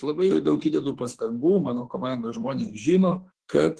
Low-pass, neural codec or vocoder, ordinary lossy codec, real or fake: 10.8 kHz; autoencoder, 48 kHz, 32 numbers a frame, DAC-VAE, trained on Japanese speech; Opus, 16 kbps; fake